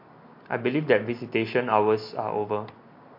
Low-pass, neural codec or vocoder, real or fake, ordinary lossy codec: 5.4 kHz; none; real; MP3, 32 kbps